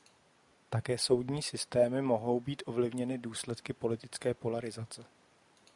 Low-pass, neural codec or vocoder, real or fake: 10.8 kHz; none; real